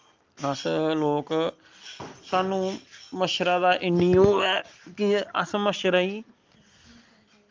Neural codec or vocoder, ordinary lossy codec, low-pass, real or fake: none; Opus, 32 kbps; 7.2 kHz; real